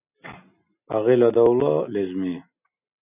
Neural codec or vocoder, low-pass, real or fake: none; 3.6 kHz; real